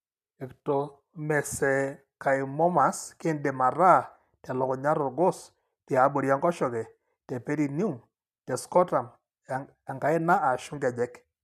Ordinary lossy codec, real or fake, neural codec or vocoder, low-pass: none; real; none; 14.4 kHz